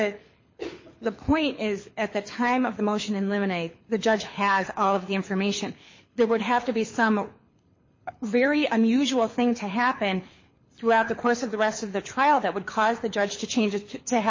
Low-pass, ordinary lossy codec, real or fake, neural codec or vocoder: 7.2 kHz; MP3, 32 kbps; fake; codec, 24 kHz, 6 kbps, HILCodec